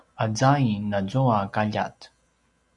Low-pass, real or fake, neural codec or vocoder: 10.8 kHz; real; none